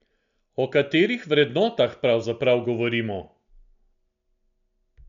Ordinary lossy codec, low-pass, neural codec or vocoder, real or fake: none; 7.2 kHz; none; real